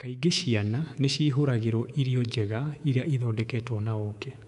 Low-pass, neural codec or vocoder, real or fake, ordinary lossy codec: 10.8 kHz; codec, 24 kHz, 3.1 kbps, DualCodec; fake; none